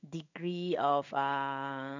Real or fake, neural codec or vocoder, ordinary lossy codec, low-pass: fake; codec, 16 kHz in and 24 kHz out, 1 kbps, XY-Tokenizer; none; 7.2 kHz